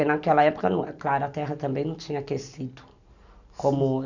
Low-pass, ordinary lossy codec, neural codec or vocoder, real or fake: 7.2 kHz; none; none; real